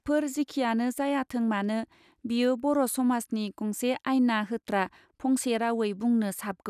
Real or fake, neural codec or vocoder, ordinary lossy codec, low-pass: real; none; none; 14.4 kHz